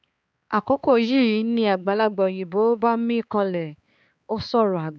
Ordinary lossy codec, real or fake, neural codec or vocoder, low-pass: none; fake; codec, 16 kHz, 4 kbps, X-Codec, HuBERT features, trained on balanced general audio; none